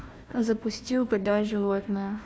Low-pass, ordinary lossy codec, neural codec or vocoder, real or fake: none; none; codec, 16 kHz, 1 kbps, FunCodec, trained on Chinese and English, 50 frames a second; fake